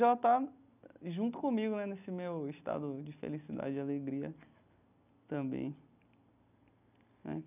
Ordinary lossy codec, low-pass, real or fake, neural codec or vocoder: none; 3.6 kHz; real; none